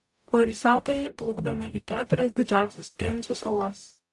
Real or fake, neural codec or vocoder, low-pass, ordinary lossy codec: fake; codec, 44.1 kHz, 0.9 kbps, DAC; 10.8 kHz; AAC, 64 kbps